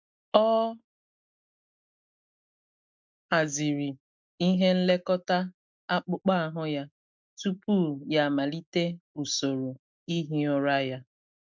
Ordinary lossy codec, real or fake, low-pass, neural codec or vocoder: MP3, 64 kbps; real; 7.2 kHz; none